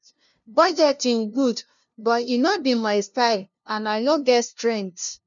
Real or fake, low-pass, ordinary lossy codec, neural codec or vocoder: fake; 7.2 kHz; none; codec, 16 kHz, 0.5 kbps, FunCodec, trained on LibriTTS, 25 frames a second